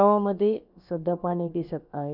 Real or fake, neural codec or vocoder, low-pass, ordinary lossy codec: fake; codec, 16 kHz, about 1 kbps, DyCAST, with the encoder's durations; 5.4 kHz; none